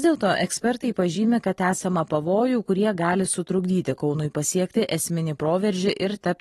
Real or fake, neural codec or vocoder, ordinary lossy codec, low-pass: real; none; AAC, 32 kbps; 14.4 kHz